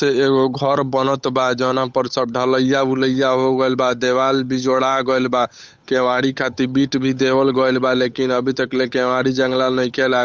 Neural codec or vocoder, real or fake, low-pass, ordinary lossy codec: codec, 16 kHz, 8 kbps, FunCodec, trained on Chinese and English, 25 frames a second; fake; none; none